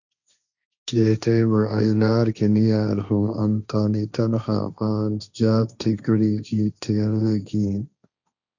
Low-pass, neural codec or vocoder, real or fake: 7.2 kHz; codec, 16 kHz, 1.1 kbps, Voila-Tokenizer; fake